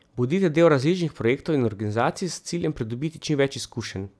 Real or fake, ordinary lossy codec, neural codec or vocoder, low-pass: real; none; none; none